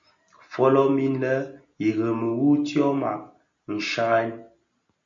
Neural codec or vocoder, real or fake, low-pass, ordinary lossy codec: none; real; 7.2 kHz; MP3, 48 kbps